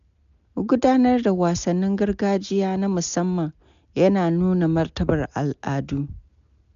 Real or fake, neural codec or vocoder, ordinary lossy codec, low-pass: real; none; none; 7.2 kHz